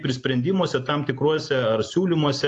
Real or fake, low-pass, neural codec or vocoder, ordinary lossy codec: real; 10.8 kHz; none; AAC, 48 kbps